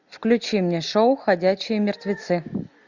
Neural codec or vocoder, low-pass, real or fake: none; 7.2 kHz; real